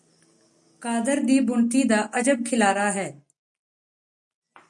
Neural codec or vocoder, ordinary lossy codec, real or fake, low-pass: none; MP3, 96 kbps; real; 10.8 kHz